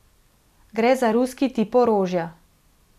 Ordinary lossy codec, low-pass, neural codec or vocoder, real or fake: none; 14.4 kHz; none; real